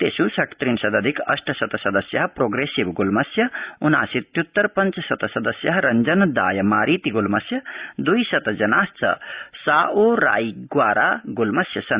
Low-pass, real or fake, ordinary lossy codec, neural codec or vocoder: 3.6 kHz; real; Opus, 64 kbps; none